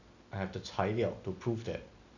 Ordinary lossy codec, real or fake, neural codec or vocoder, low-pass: none; real; none; 7.2 kHz